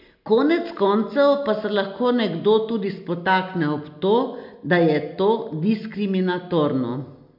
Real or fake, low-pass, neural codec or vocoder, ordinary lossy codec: real; 5.4 kHz; none; MP3, 48 kbps